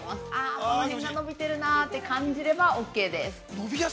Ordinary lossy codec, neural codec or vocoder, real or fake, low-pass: none; none; real; none